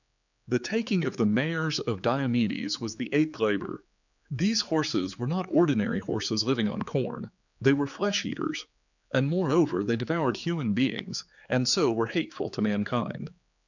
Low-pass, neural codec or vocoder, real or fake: 7.2 kHz; codec, 16 kHz, 4 kbps, X-Codec, HuBERT features, trained on general audio; fake